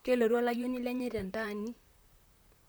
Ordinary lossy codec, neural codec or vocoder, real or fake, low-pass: none; vocoder, 44.1 kHz, 128 mel bands, Pupu-Vocoder; fake; none